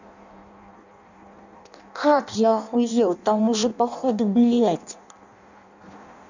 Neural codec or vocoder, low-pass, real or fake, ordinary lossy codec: codec, 16 kHz in and 24 kHz out, 0.6 kbps, FireRedTTS-2 codec; 7.2 kHz; fake; none